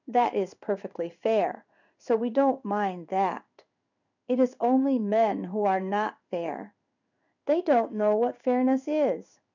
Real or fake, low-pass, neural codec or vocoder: fake; 7.2 kHz; codec, 16 kHz in and 24 kHz out, 1 kbps, XY-Tokenizer